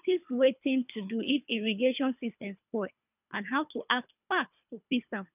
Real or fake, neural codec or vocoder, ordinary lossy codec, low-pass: fake; codec, 24 kHz, 3 kbps, HILCodec; none; 3.6 kHz